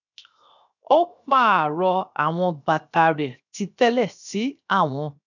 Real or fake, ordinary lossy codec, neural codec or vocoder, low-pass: fake; none; codec, 16 kHz, 0.7 kbps, FocalCodec; 7.2 kHz